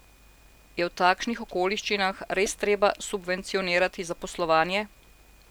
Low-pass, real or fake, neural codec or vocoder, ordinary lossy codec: none; real; none; none